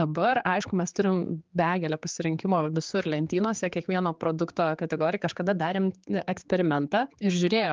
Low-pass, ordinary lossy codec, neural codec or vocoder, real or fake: 7.2 kHz; Opus, 24 kbps; codec, 16 kHz, 4 kbps, X-Codec, HuBERT features, trained on general audio; fake